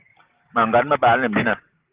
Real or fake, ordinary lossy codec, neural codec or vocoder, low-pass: real; Opus, 16 kbps; none; 3.6 kHz